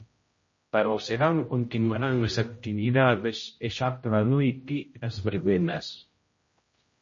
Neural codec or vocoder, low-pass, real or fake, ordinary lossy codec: codec, 16 kHz, 0.5 kbps, X-Codec, HuBERT features, trained on general audio; 7.2 kHz; fake; MP3, 32 kbps